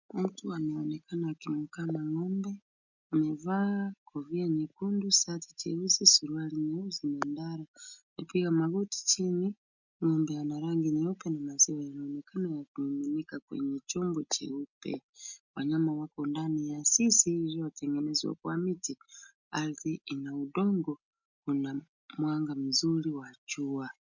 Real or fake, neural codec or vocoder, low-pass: real; none; 7.2 kHz